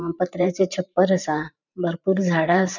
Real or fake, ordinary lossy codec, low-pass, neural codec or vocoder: fake; none; 7.2 kHz; vocoder, 44.1 kHz, 128 mel bands every 512 samples, BigVGAN v2